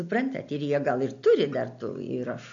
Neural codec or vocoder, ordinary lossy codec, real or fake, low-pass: none; AAC, 64 kbps; real; 7.2 kHz